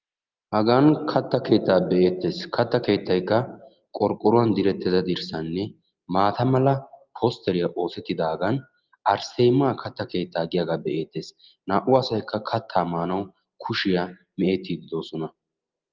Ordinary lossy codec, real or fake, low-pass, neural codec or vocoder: Opus, 24 kbps; real; 7.2 kHz; none